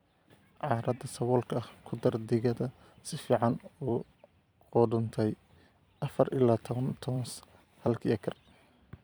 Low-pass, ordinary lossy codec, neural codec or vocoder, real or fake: none; none; vocoder, 44.1 kHz, 128 mel bands every 256 samples, BigVGAN v2; fake